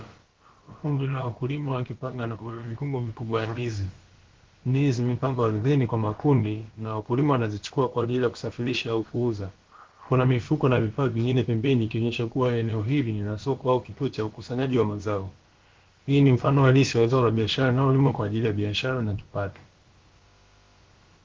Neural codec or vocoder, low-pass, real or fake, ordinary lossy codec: codec, 16 kHz, about 1 kbps, DyCAST, with the encoder's durations; 7.2 kHz; fake; Opus, 16 kbps